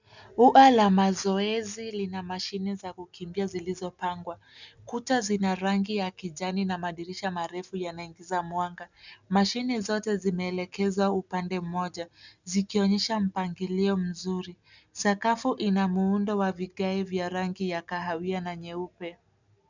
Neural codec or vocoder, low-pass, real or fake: none; 7.2 kHz; real